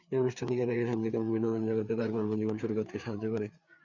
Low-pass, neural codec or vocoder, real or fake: 7.2 kHz; codec, 16 kHz, 4 kbps, FreqCodec, larger model; fake